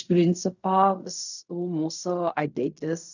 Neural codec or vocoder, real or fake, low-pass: codec, 16 kHz in and 24 kHz out, 0.4 kbps, LongCat-Audio-Codec, fine tuned four codebook decoder; fake; 7.2 kHz